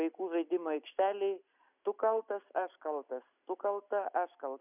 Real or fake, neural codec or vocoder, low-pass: real; none; 3.6 kHz